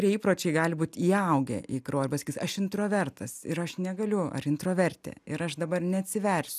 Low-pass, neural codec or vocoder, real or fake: 14.4 kHz; none; real